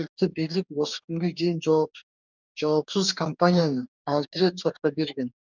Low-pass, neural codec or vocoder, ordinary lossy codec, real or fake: 7.2 kHz; codec, 44.1 kHz, 2.6 kbps, DAC; none; fake